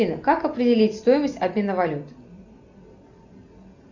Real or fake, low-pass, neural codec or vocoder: real; 7.2 kHz; none